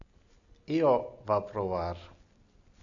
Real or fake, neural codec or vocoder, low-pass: real; none; 7.2 kHz